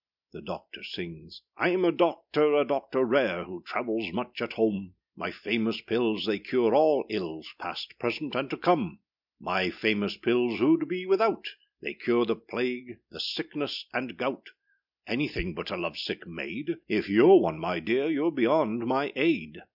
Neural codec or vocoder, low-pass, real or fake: none; 5.4 kHz; real